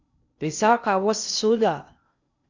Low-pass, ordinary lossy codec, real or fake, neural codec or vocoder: 7.2 kHz; Opus, 64 kbps; fake; codec, 16 kHz in and 24 kHz out, 0.6 kbps, FocalCodec, streaming, 2048 codes